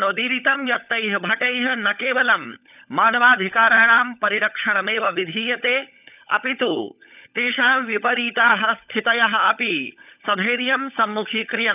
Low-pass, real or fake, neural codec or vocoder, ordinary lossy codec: 3.6 kHz; fake; codec, 16 kHz, 16 kbps, FunCodec, trained on LibriTTS, 50 frames a second; none